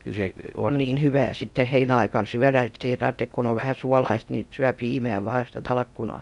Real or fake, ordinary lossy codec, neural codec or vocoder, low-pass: fake; none; codec, 16 kHz in and 24 kHz out, 0.6 kbps, FocalCodec, streaming, 4096 codes; 10.8 kHz